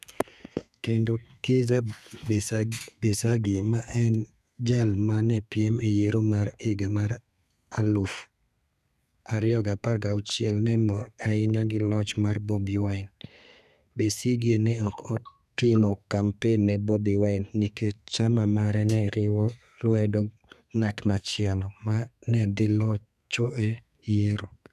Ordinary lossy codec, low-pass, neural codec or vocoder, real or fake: none; 14.4 kHz; codec, 32 kHz, 1.9 kbps, SNAC; fake